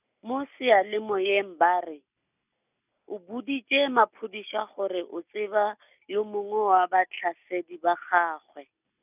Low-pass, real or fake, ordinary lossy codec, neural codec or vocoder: 3.6 kHz; real; none; none